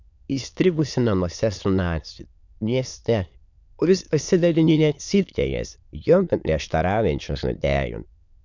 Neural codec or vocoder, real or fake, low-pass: autoencoder, 22.05 kHz, a latent of 192 numbers a frame, VITS, trained on many speakers; fake; 7.2 kHz